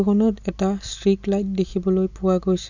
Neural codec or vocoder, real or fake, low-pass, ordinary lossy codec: none; real; 7.2 kHz; none